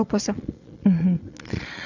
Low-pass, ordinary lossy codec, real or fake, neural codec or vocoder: 7.2 kHz; none; fake; vocoder, 22.05 kHz, 80 mel bands, Vocos